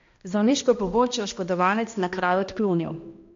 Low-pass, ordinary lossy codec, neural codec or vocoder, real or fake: 7.2 kHz; MP3, 48 kbps; codec, 16 kHz, 1 kbps, X-Codec, HuBERT features, trained on balanced general audio; fake